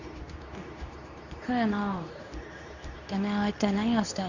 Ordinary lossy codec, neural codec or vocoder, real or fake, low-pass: none; codec, 24 kHz, 0.9 kbps, WavTokenizer, medium speech release version 2; fake; 7.2 kHz